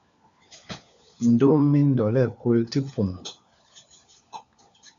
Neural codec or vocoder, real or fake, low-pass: codec, 16 kHz, 4 kbps, FunCodec, trained on LibriTTS, 50 frames a second; fake; 7.2 kHz